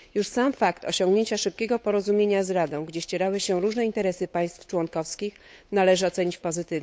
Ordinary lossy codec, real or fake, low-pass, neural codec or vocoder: none; fake; none; codec, 16 kHz, 8 kbps, FunCodec, trained on Chinese and English, 25 frames a second